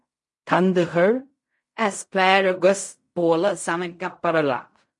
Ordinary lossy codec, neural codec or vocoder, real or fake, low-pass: MP3, 48 kbps; codec, 16 kHz in and 24 kHz out, 0.4 kbps, LongCat-Audio-Codec, fine tuned four codebook decoder; fake; 10.8 kHz